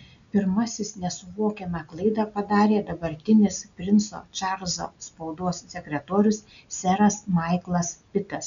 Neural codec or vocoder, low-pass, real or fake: none; 7.2 kHz; real